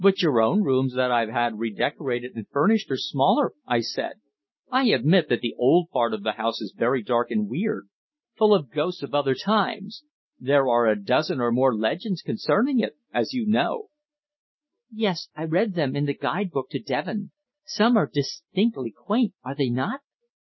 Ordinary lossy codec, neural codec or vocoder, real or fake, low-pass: MP3, 24 kbps; none; real; 7.2 kHz